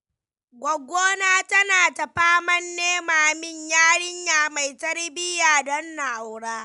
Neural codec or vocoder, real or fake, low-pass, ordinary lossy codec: none; real; 10.8 kHz; none